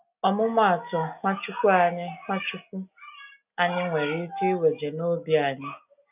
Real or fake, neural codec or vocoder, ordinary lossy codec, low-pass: real; none; none; 3.6 kHz